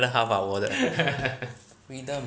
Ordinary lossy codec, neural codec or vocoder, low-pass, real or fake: none; none; none; real